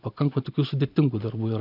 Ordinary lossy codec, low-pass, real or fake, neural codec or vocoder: AAC, 48 kbps; 5.4 kHz; real; none